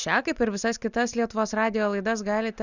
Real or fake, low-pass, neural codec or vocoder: real; 7.2 kHz; none